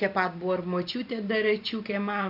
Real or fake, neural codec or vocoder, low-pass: fake; vocoder, 44.1 kHz, 128 mel bands every 512 samples, BigVGAN v2; 5.4 kHz